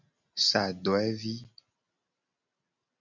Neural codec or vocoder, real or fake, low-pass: none; real; 7.2 kHz